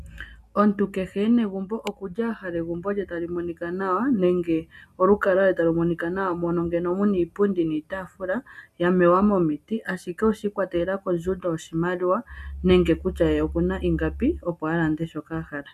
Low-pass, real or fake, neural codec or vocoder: 14.4 kHz; real; none